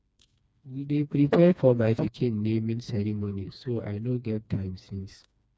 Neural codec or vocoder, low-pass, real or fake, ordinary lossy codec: codec, 16 kHz, 2 kbps, FreqCodec, smaller model; none; fake; none